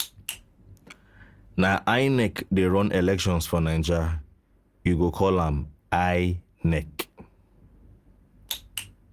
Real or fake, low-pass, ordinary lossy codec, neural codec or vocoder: real; 14.4 kHz; Opus, 32 kbps; none